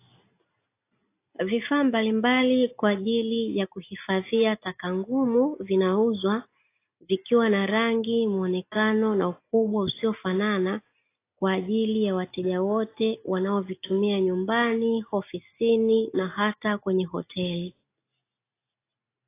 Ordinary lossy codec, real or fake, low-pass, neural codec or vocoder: AAC, 24 kbps; real; 3.6 kHz; none